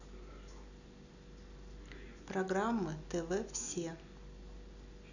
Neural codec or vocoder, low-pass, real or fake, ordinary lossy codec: none; 7.2 kHz; real; none